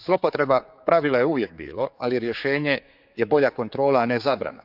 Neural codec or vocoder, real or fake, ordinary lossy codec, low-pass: codec, 16 kHz, 4 kbps, X-Codec, HuBERT features, trained on general audio; fake; none; 5.4 kHz